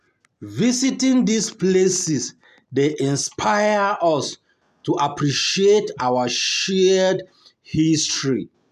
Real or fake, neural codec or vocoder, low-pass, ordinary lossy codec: real; none; 14.4 kHz; none